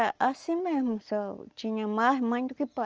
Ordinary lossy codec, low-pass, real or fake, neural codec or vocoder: Opus, 24 kbps; 7.2 kHz; real; none